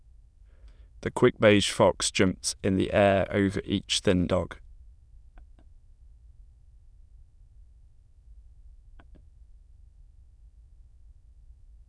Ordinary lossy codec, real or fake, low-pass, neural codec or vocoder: none; fake; none; autoencoder, 22.05 kHz, a latent of 192 numbers a frame, VITS, trained on many speakers